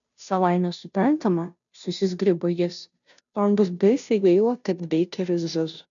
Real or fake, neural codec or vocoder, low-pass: fake; codec, 16 kHz, 0.5 kbps, FunCodec, trained on Chinese and English, 25 frames a second; 7.2 kHz